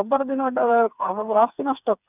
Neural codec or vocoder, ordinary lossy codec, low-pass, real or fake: codec, 16 kHz, 4 kbps, FreqCodec, smaller model; none; 3.6 kHz; fake